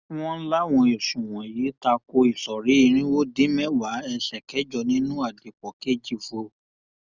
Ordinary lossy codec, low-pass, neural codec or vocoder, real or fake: Opus, 64 kbps; 7.2 kHz; none; real